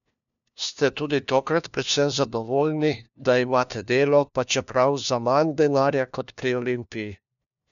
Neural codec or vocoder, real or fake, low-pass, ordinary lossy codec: codec, 16 kHz, 1 kbps, FunCodec, trained on LibriTTS, 50 frames a second; fake; 7.2 kHz; none